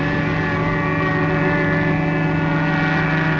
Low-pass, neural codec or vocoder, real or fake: 7.2 kHz; none; real